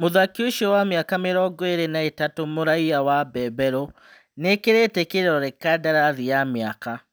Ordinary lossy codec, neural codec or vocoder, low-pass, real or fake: none; none; none; real